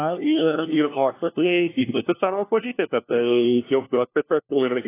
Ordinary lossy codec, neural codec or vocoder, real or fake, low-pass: AAC, 24 kbps; codec, 16 kHz, 1 kbps, FunCodec, trained on LibriTTS, 50 frames a second; fake; 3.6 kHz